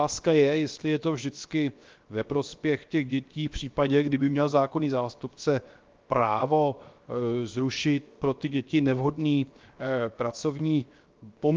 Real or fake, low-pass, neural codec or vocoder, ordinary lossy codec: fake; 7.2 kHz; codec, 16 kHz, 0.7 kbps, FocalCodec; Opus, 24 kbps